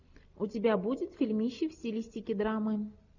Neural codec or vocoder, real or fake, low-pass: none; real; 7.2 kHz